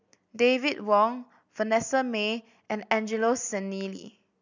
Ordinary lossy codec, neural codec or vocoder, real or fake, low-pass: none; none; real; 7.2 kHz